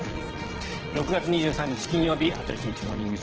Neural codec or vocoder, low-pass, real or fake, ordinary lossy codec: vocoder, 22.05 kHz, 80 mel bands, Vocos; 7.2 kHz; fake; Opus, 16 kbps